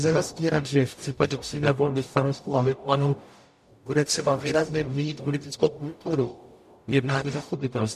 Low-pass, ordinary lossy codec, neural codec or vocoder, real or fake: 14.4 kHz; MP3, 64 kbps; codec, 44.1 kHz, 0.9 kbps, DAC; fake